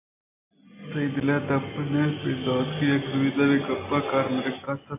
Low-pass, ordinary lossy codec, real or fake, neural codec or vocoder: 3.6 kHz; AAC, 24 kbps; real; none